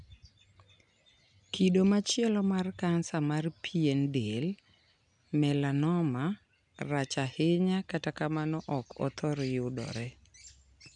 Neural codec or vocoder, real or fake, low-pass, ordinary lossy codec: none; real; 9.9 kHz; none